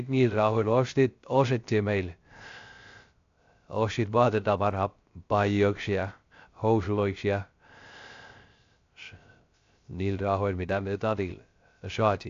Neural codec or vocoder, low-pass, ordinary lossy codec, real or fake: codec, 16 kHz, 0.3 kbps, FocalCodec; 7.2 kHz; AAC, 48 kbps; fake